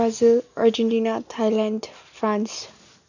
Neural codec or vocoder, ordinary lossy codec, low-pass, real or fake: none; none; 7.2 kHz; real